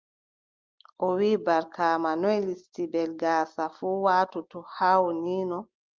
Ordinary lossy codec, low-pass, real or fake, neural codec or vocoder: Opus, 24 kbps; 7.2 kHz; real; none